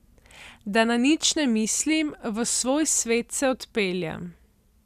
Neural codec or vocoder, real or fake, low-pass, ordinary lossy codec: none; real; 14.4 kHz; none